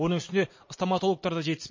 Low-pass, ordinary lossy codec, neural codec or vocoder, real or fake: 7.2 kHz; MP3, 32 kbps; none; real